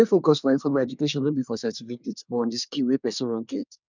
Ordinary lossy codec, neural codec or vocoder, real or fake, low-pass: none; codec, 24 kHz, 1 kbps, SNAC; fake; 7.2 kHz